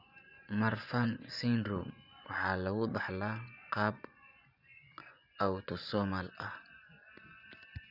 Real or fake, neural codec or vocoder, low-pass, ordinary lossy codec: fake; vocoder, 24 kHz, 100 mel bands, Vocos; 5.4 kHz; none